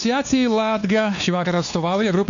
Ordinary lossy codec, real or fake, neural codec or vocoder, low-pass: MP3, 96 kbps; fake; codec, 16 kHz, 2 kbps, X-Codec, WavLM features, trained on Multilingual LibriSpeech; 7.2 kHz